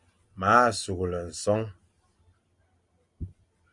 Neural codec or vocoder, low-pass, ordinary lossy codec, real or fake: none; 10.8 kHz; Opus, 64 kbps; real